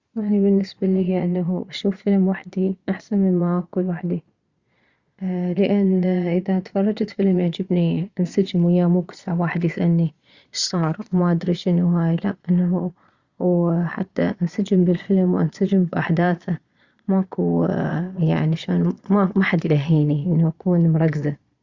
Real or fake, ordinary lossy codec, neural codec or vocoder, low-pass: fake; Opus, 64 kbps; vocoder, 22.05 kHz, 80 mel bands, Vocos; 7.2 kHz